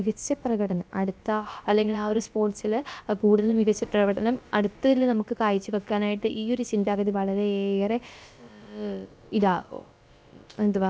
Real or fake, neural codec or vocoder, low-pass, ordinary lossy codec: fake; codec, 16 kHz, about 1 kbps, DyCAST, with the encoder's durations; none; none